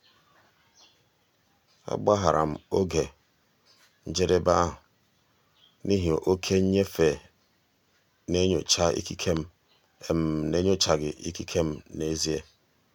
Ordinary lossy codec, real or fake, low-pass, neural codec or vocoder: none; real; 19.8 kHz; none